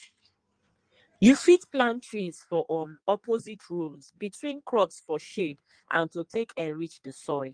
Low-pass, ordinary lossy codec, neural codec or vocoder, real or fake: 9.9 kHz; Opus, 32 kbps; codec, 16 kHz in and 24 kHz out, 1.1 kbps, FireRedTTS-2 codec; fake